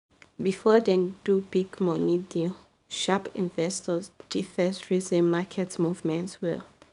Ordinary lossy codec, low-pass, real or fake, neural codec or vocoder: none; 10.8 kHz; fake; codec, 24 kHz, 0.9 kbps, WavTokenizer, small release